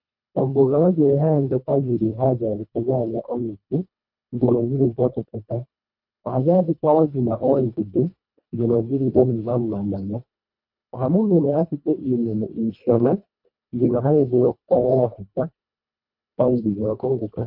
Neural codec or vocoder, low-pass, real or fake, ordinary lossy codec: codec, 24 kHz, 1.5 kbps, HILCodec; 5.4 kHz; fake; MP3, 48 kbps